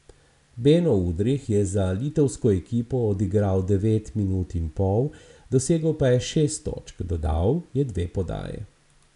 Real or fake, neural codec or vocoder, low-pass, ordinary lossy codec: real; none; 10.8 kHz; none